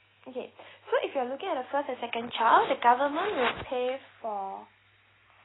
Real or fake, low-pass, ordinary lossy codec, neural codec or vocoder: real; 7.2 kHz; AAC, 16 kbps; none